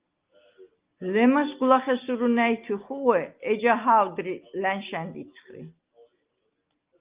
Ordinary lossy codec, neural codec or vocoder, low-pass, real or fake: Opus, 32 kbps; none; 3.6 kHz; real